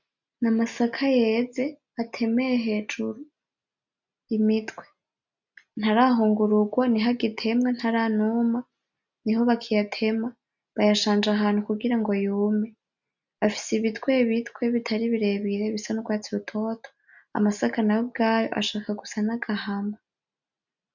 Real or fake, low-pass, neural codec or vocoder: real; 7.2 kHz; none